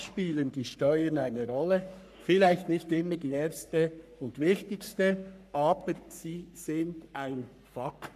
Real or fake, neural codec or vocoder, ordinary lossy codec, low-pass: fake; codec, 44.1 kHz, 3.4 kbps, Pupu-Codec; none; 14.4 kHz